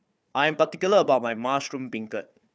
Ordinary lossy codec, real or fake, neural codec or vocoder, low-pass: none; fake; codec, 16 kHz, 4 kbps, FunCodec, trained on Chinese and English, 50 frames a second; none